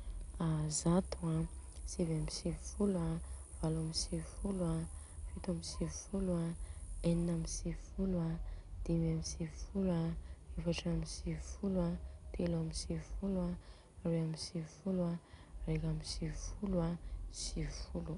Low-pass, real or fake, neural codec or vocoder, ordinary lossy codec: 10.8 kHz; real; none; none